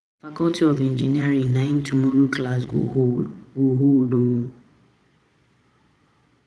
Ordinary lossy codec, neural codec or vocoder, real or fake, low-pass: none; vocoder, 22.05 kHz, 80 mel bands, Vocos; fake; none